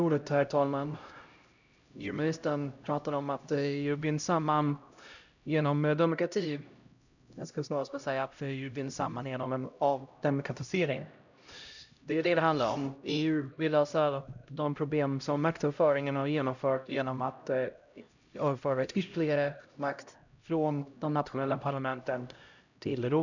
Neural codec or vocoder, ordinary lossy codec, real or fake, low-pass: codec, 16 kHz, 0.5 kbps, X-Codec, HuBERT features, trained on LibriSpeech; none; fake; 7.2 kHz